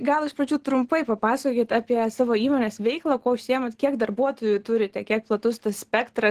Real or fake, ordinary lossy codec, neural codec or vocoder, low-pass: real; Opus, 16 kbps; none; 14.4 kHz